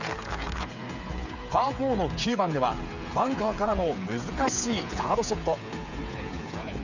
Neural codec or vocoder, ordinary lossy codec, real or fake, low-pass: codec, 16 kHz, 8 kbps, FreqCodec, smaller model; none; fake; 7.2 kHz